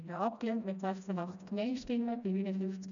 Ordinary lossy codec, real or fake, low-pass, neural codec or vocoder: none; fake; 7.2 kHz; codec, 16 kHz, 1 kbps, FreqCodec, smaller model